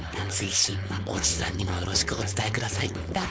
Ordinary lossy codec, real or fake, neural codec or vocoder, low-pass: none; fake; codec, 16 kHz, 4.8 kbps, FACodec; none